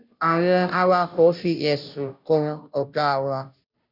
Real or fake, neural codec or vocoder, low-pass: fake; codec, 16 kHz, 0.5 kbps, FunCodec, trained on Chinese and English, 25 frames a second; 5.4 kHz